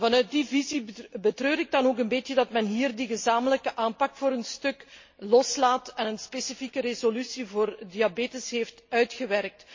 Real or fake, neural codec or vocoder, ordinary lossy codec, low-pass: real; none; MP3, 32 kbps; 7.2 kHz